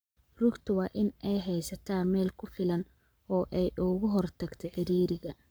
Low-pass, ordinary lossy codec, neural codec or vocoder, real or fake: none; none; codec, 44.1 kHz, 7.8 kbps, Pupu-Codec; fake